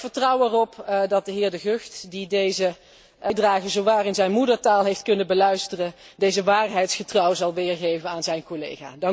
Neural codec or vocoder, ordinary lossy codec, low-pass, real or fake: none; none; none; real